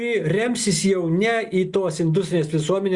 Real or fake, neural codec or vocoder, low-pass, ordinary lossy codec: real; none; 10.8 kHz; Opus, 64 kbps